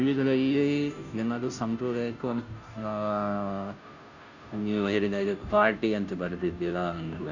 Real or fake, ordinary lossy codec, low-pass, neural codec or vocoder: fake; none; 7.2 kHz; codec, 16 kHz, 0.5 kbps, FunCodec, trained on Chinese and English, 25 frames a second